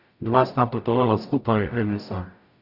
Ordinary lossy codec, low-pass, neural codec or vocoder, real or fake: none; 5.4 kHz; codec, 44.1 kHz, 0.9 kbps, DAC; fake